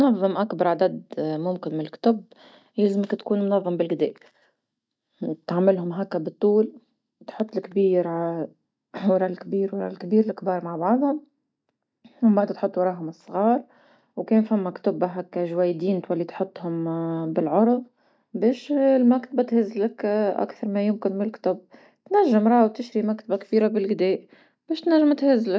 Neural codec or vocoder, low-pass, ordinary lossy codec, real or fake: none; none; none; real